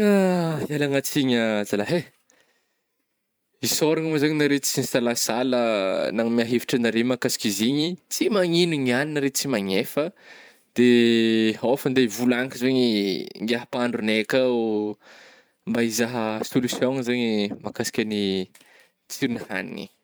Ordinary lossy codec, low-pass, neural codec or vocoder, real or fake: none; none; vocoder, 44.1 kHz, 128 mel bands every 512 samples, BigVGAN v2; fake